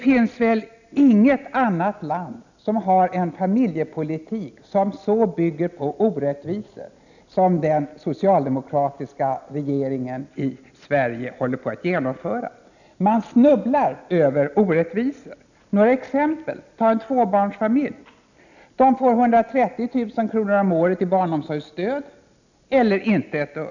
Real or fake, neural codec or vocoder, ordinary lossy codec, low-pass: real; none; none; 7.2 kHz